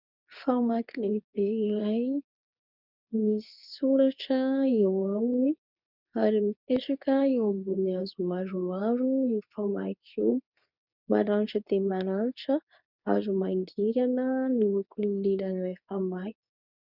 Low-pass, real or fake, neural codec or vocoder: 5.4 kHz; fake; codec, 24 kHz, 0.9 kbps, WavTokenizer, medium speech release version 2